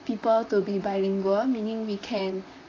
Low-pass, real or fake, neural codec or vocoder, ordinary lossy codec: 7.2 kHz; fake; vocoder, 44.1 kHz, 128 mel bands, Pupu-Vocoder; AAC, 32 kbps